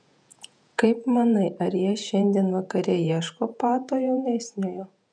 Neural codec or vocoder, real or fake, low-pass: vocoder, 48 kHz, 128 mel bands, Vocos; fake; 9.9 kHz